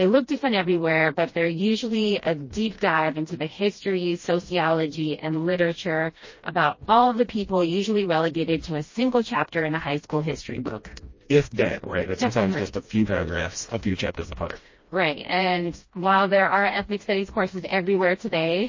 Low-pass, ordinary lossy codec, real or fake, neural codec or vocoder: 7.2 kHz; MP3, 32 kbps; fake; codec, 16 kHz, 1 kbps, FreqCodec, smaller model